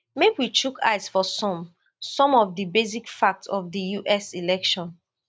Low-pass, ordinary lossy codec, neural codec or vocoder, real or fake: none; none; none; real